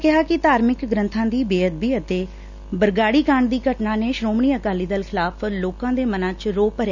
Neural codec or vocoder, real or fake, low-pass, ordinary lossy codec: none; real; 7.2 kHz; none